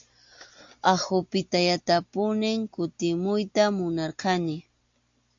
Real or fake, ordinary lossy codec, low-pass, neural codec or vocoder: real; AAC, 64 kbps; 7.2 kHz; none